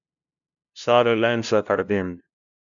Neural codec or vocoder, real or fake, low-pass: codec, 16 kHz, 0.5 kbps, FunCodec, trained on LibriTTS, 25 frames a second; fake; 7.2 kHz